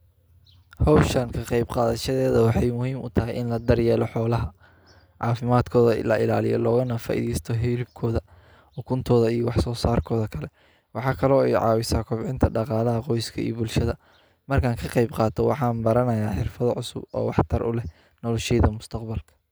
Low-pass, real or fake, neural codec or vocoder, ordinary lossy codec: none; real; none; none